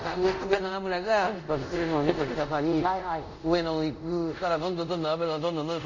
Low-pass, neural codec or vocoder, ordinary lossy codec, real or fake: 7.2 kHz; codec, 24 kHz, 0.5 kbps, DualCodec; none; fake